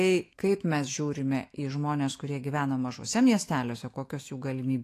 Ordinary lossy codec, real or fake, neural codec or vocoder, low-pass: AAC, 48 kbps; fake; autoencoder, 48 kHz, 128 numbers a frame, DAC-VAE, trained on Japanese speech; 14.4 kHz